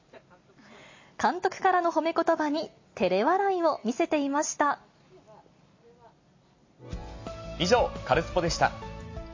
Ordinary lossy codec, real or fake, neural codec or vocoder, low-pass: MP3, 32 kbps; real; none; 7.2 kHz